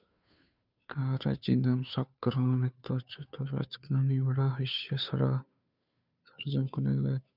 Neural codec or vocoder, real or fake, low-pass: codec, 16 kHz, 2 kbps, FunCodec, trained on Chinese and English, 25 frames a second; fake; 5.4 kHz